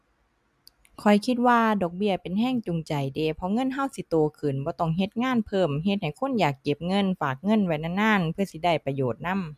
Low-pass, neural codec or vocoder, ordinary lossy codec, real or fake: 14.4 kHz; none; MP3, 96 kbps; real